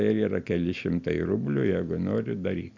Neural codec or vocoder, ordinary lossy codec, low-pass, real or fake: none; MP3, 64 kbps; 7.2 kHz; real